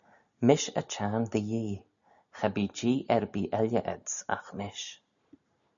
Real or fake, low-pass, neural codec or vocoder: real; 7.2 kHz; none